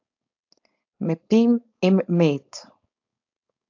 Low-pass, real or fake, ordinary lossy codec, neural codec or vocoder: 7.2 kHz; fake; AAC, 48 kbps; codec, 16 kHz, 4.8 kbps, FACodec